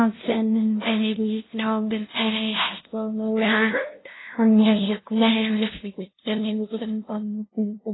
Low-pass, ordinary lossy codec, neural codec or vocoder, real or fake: 7.2 kHz; AAC, 16 kbps; codec, 16 kHz, 0.5 kbps, FunCodec, trained on LibriTTS, 25 frames a second; fake